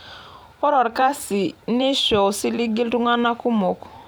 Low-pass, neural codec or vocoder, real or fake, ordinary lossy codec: none; vocoder, 44.1 kHz, 128 mel bands every 512 samples, BigVGAN v2; fake; none